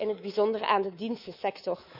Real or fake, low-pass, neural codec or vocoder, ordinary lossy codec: fake; 5.4 kHz; codec, 24 kHz, 3.1 kbps, DualCodec; none